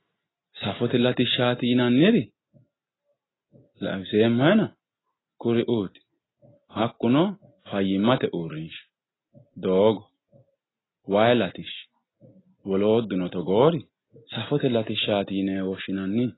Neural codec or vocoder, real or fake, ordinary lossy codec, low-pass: none; real; AAC, 16 kbps; 7.2 kHz